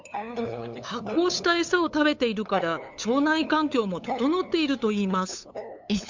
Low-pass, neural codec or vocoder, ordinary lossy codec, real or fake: 7.2 kHz; codec, 16 kHz, 8 kbps, FunCodec, trained on LibriTTS, 25 frames a second; MP3, 64 kbps; fake